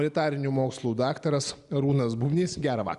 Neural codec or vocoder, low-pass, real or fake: none; 10.8 kHz; real